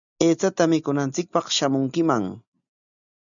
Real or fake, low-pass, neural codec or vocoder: real; 7.2 kHz; none